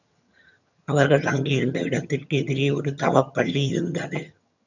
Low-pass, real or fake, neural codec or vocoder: 7.2 kHz; fake; vocoder, 22.05 kHz, 80 mel bands, HiFi-GAN